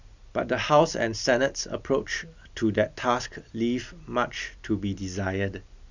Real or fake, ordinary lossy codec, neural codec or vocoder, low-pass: real; none; none; 7.2 kHz